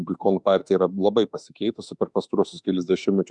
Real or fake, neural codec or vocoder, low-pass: fake; autoencoder, 48 kHz, 32 numbers a frame, DAC-VAE, trained on Japanese speech; 10.8 kHz